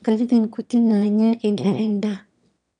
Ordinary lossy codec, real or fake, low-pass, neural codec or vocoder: none; fake; 9.9 kHz; autoencoder, 22.05 kHz, a latent of 192 numbers a frame, VITS, trained on one speaker